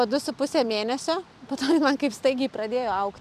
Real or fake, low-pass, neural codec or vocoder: fake; 14.4 kHz; vocoder, 44.1 kHz, 128 mel bands every 256 samples, BigVGAN v2